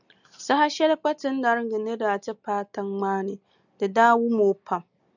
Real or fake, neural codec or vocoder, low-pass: real; none; 7.2 kHz